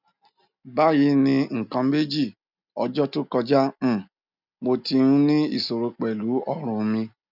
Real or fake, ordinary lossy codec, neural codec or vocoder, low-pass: real; none; none; 5.4 kHz